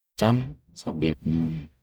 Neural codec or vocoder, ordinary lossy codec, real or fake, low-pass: codec, 44.1 kHz, 0.9 kbps, DAC; none; fake; none